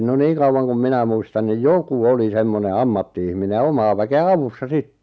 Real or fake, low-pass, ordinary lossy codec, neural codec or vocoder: real; none; none; none